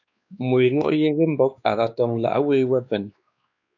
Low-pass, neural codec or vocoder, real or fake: 7.2 kHz; codec, 16 kHz, 2 kbps, X-Codec, WavLM features, trained on Multilingual LibriSpeech; fake